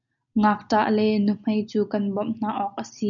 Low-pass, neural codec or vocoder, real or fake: 7.2 kHz; none; real